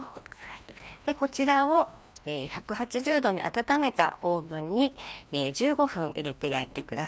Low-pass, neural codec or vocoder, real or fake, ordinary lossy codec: none; codec, 16 kHz, 1 kbps, FreqCodec, larger model; fake; none